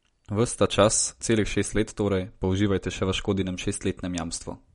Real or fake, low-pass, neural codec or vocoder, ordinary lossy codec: real; 10.8 kHz; none; MP3, 48 kbps